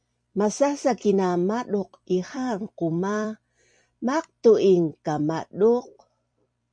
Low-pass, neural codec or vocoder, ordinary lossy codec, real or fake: 9.9 kHz; vocoder, 44.1 kHz, 128 mel bands every 256 samples, BigVGAN v2; MP3, 64 kbps; fake